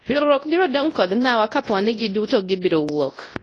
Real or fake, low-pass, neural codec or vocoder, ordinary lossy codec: fake; 10.8 kHz; codec, 24 kHz, 0.9 kbps, WavTokenizer, large speech release; AAC, 32 kbps